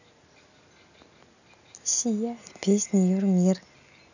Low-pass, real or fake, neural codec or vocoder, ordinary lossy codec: 7.2 kHz; real; none; none